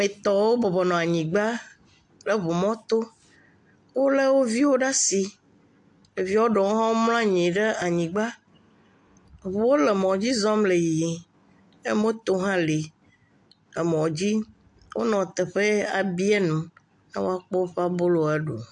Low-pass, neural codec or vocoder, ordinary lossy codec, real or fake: 10.8 kHz; none; AAC, 64 kbps; real